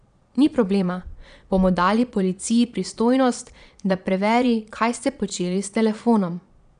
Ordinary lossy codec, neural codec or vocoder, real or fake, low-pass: none; vocoder, 22.05 kHz, 80 mel bands, Vocos; fake; 9.9 kHz